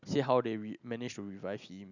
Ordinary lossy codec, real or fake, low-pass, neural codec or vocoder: none; real; 7.2 kHz; none